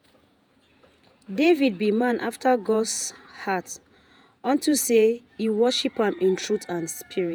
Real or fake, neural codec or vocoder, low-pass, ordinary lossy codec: fake; vocoder, 48 kHz, 128 mel bands, Vocos; none; none